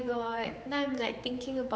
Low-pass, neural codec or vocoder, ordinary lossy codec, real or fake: none; codec, 16 kHz, 4 kbps, X-Codec, HuBERT features, trained on general audio; none; fake